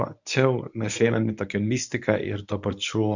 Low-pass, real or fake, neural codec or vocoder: 7.2 kHz; fake; codec, 24 kHz, 0.9 kbps, WavTokenizer, medium speech release version 1